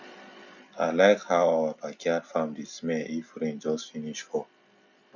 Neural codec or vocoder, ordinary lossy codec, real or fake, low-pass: none; none; real; 7.2 kHz